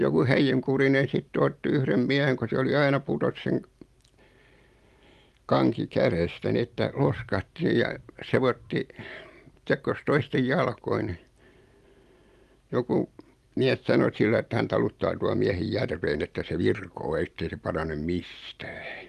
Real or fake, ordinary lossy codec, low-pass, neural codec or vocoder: real; Opus, 32 kbps; 14.4 kHz; none